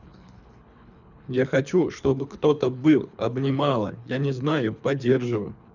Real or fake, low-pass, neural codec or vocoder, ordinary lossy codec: fake; 7.2 kHz; codec, 24 kHz, 3 kbps, HILCodec; none